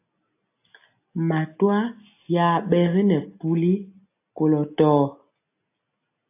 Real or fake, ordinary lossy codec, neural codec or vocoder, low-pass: real; AAC, 24 kbps; none; 3.6 kHz